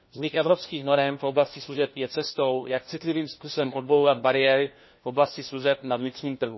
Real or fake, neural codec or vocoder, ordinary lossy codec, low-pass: fake; codec, 16 kHz, 1 kbps, FunCodec, trained on LibriTTS, 50 frames a second; MP3, 24 kbps; 7.2 kHz